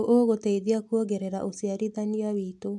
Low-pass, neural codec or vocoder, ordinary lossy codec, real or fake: none; none; none; real